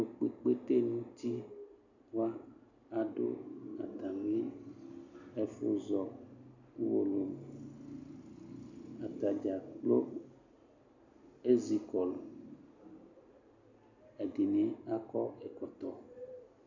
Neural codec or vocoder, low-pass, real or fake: none; 7.2 kHz; real